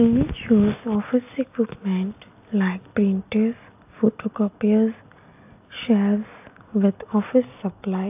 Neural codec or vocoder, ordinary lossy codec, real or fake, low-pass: none; AAC, 32 kbps; real; 3.6 kHz